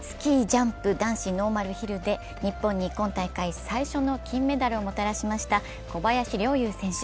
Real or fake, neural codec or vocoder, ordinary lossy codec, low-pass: real; none; none; none